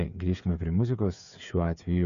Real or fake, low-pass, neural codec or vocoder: fake; 7.2 kHz; codec, 16 kHz, 16 kbps, FreqCodec, smaller model